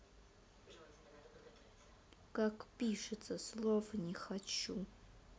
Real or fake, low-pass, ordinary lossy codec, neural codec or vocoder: real; none; none; none